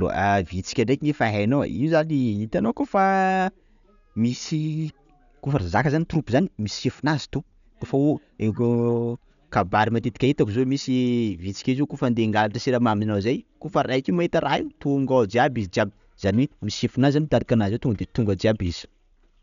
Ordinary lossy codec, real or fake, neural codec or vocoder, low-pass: none; real; none; 7.2 kHz